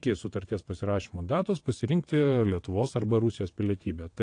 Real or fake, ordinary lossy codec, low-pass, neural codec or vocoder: fake; AAC, 48 kbps; 9.9 kHz; vocoder, 22.05 kHz, 80 mel bands, WaveNeXt